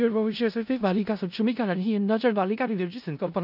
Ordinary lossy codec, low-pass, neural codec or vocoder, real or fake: AAC, 48 kbps; 5.4 kHz; codec, 16 kHz in and 24 kHz out, 0.4 kbps, LongCat-Audio-Codec, four codebook decoder; fake